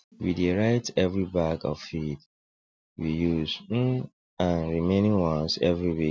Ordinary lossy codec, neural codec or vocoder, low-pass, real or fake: none; none; none; real